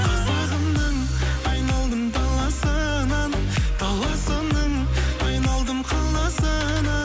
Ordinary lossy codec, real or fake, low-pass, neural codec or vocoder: none; real; none; none